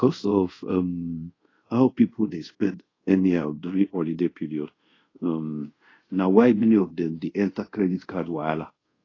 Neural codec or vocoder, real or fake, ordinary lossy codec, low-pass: codec, 24 kHz, 0.5 kbps, DualCodec; fake; AAC, 32 kbps; 7.2 kHz